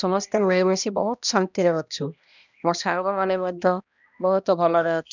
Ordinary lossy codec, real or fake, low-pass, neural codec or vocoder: none; fake; 7.2 kHz; codec, 16 kHz, 1 kbps, X-Codec, HuBERT features, trained on balanced general audio